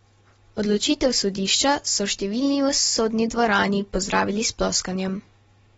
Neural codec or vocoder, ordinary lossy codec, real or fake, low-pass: none; AAC, 24 kbps; real; 19.8 kHz